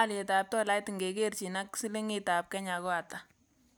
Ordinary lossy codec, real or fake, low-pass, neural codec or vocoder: none; real; none; none